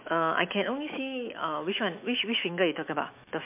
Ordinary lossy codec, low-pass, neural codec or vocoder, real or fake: MP3, 32 kbps; 3.6 kHz; autoencoder, 48 kHz, 128 numbers a frame, DAC-VAE, trained on Japanese speech; fake